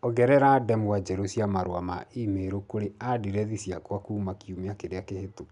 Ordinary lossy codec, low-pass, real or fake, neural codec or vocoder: none; 10.8 kHz; real; none